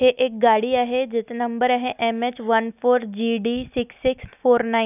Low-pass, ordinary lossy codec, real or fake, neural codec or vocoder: 3.6 kHz; none; real; none